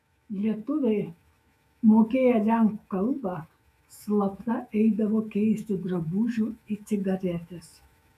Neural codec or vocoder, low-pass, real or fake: autoencoder, 48 kHz, 128 numbers a frame, DAC-VAE, trained on Japanese speech; 14.4 kHz; fake